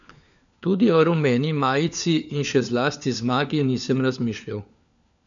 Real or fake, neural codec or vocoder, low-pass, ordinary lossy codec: fake; codec, 16 kHz, 4 kbps, FunCodec, trained on LibriTTS, 50 frames a second; 7.2 kHz; none